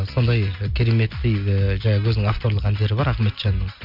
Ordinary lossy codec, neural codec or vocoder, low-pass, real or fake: MP3, 48 kbps; none; 5.4 kHz; real